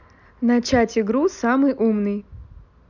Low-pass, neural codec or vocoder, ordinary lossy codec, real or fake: 7.2 kHz; none; none; real